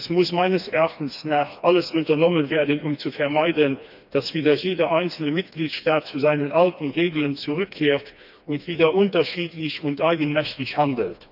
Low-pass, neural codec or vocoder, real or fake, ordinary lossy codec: 5.4 kHz; codec, 16 kHz, 2 kbps, FreqCodec, smaller model; fake; none